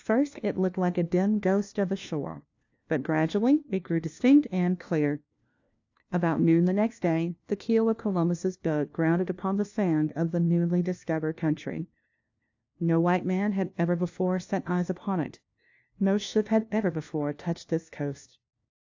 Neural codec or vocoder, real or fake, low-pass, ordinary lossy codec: codec, 16 kHz, 1 kbps, FunCodec, trained on LibriTTS, 50 frames a second; fake; 7.2 kHz; AAC, 48 kbps